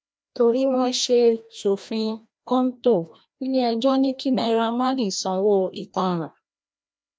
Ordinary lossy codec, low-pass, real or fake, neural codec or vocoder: none; none; fake; codec, 16 kHz, 1 kbps, FreqCodec, larger model